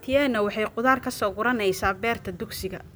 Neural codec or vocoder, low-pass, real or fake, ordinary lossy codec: none; none; real; none